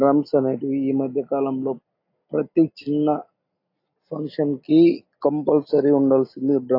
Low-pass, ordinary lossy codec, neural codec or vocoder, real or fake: 5.4 kHz; AAC, 32 kbps; none; real